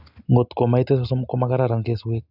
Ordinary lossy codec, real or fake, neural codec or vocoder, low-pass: none; real; none; 5.4 kHz